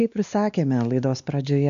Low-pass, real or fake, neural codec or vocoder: 7.2 kHz; fake; codec, 16 kHz, 2 kbps, X-Codec, WavLM features, trained on Multilingual LibriSpeech